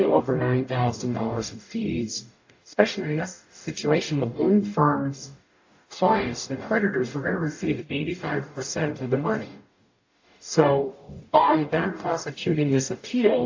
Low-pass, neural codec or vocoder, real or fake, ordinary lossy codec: 7.2 kHz; codec, 44.1 kHz, 0.9 kbps, DAC; fake; AAC, 48 kbps